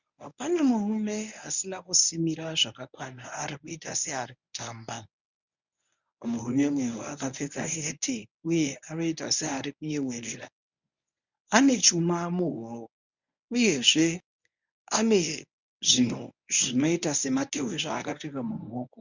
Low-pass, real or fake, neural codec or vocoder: 7.2 kHz; fake; codec, 24 kHz, 0.9 kbps, WavTokenizer, medium speech release version 1